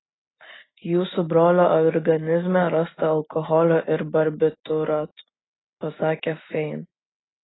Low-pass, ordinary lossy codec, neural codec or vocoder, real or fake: 7.2 kHz; AAC, 16 kbps; none; real